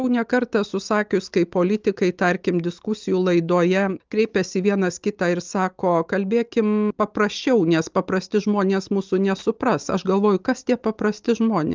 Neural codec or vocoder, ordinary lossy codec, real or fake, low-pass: none; Opus, 24 kbps; real; 7.2 kHz